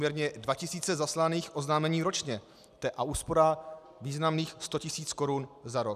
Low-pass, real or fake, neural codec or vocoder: 14.4 kHz; real; none